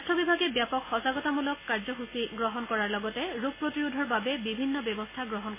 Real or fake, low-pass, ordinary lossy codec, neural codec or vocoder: real; 3.6 kHz; MP3, 16 kbps; none